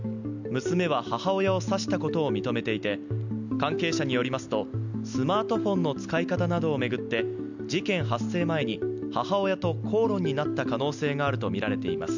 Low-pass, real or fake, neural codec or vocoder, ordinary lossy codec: 7.2 kHz; real; none; none